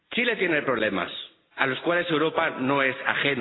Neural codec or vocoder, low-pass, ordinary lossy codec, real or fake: none; 7.2 kHz; AAC, 16 kbps; real